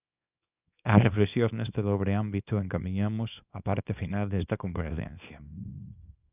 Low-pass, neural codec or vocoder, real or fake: 3.6 kHz; codec, 24 kHz, 0.9 kbps, WavTokenizer, small release; fake